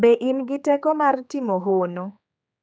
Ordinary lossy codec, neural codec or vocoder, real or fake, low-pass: none; codec, 16 kHz, 4 kbps, X-Codec, HuBERT features, trained on general audio; fake; none